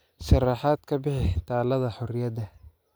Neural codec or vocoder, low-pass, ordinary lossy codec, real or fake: none; none; none; real